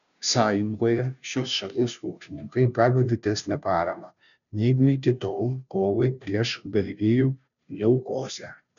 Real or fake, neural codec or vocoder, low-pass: fake; codec, 16 kHz, 0.5 kbps, FunCodec, trained on Chinese and English, 25 frames a second; 7.2 kHz